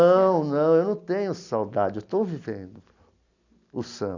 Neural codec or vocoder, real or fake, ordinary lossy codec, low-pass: none; real; none; 7.2 kHz